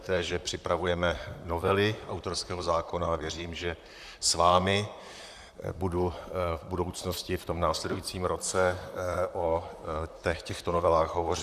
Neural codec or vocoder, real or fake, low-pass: vocoder, 44.1 kHz, 128 mel bands, Pupu-Vocoder; fake; 14.4 kHz